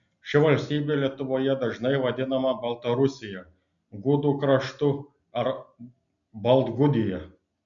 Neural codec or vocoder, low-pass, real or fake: none; 7.2 kHz; real